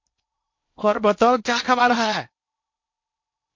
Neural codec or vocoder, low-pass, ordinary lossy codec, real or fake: codec, 16 kHz in and 24 kHz out, 0.8 kbps, FocalCodec, streaming, 65536 codes; 7.2 kHz; MP3, 48 kbps; fake